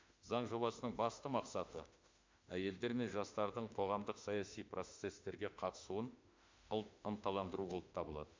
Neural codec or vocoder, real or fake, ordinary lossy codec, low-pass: autoencoder, 48 kHz, 32 numbers a frame, DAC-VAE, trained on Japanese speech; fake; MP3, 64 kbps; 7.2 kHz